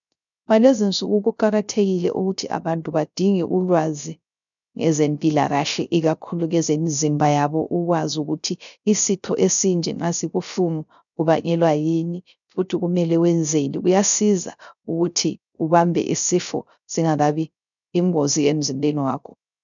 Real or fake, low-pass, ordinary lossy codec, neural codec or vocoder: fake; 7.2 kHz; MP3, 96 kbps; codec, 16 kHz, 0.3 kbps, FocalCodec